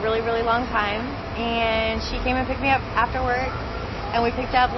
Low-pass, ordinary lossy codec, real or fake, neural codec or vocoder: 7.2 kHz; MP3, 24 kbps; real; none